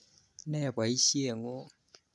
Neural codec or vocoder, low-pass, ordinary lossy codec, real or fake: none; none; none; real